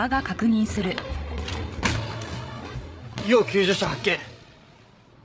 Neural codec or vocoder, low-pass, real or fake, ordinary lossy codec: codec, 16 kHz, 8 kbps, FreqCodec, larger model; none; fake; none